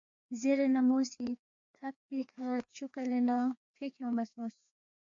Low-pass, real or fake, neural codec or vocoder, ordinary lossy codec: 7.2 kHz; fake; codec, 16 kHz, 2 kbps, FreqCodec, larger model; MP3, 48 kbps